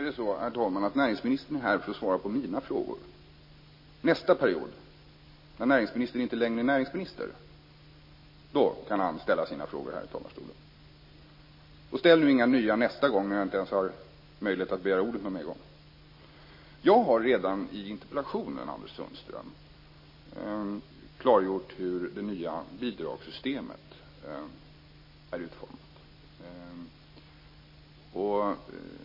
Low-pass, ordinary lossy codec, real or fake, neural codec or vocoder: 5.4 kHz; MP3, 24 kbps; real; none